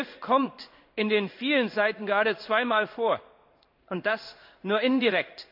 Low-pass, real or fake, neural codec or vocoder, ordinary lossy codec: 5.4 kHz; fake; codec, 16 kHz in and 24 kHz out, 1 kbps, XY-Tokenizer; none